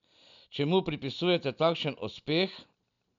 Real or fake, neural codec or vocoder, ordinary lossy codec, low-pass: real; none; none; 7.2 kHz